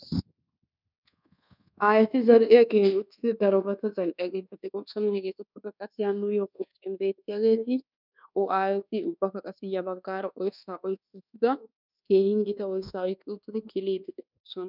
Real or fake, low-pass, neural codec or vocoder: fake; 5.4 kHz; codec, 24 kHz, 1.2 kbps, DualCodec